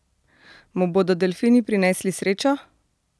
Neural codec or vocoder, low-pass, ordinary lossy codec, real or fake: none; none; none; real